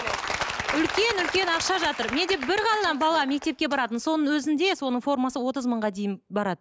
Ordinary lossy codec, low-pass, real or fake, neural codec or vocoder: none; none; real; none